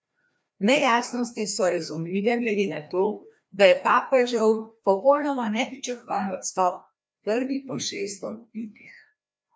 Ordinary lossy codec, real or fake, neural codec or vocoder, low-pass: none; fake; codec, 16 kHz, 1 kbps, FreqCodec, larger model; none